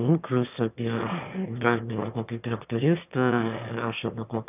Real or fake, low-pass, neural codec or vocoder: fake; 3.6 kHz; autoencoder, 22.05 kHz, a latent of 192 numbers a frame, VITS, trained on one speaker